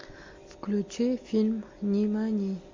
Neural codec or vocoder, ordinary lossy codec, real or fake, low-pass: none; MP3, 48 kbps; real; 7.2 kHz